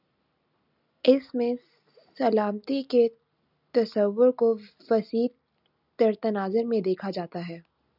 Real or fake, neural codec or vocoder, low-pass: real; none; 5.4 kHz